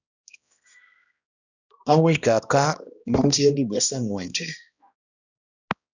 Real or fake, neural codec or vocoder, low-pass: fake; codec, 16 kHz, 1 kbps, X-Codec, HuBERT features, trained on balanced general audio; 7.2 kHz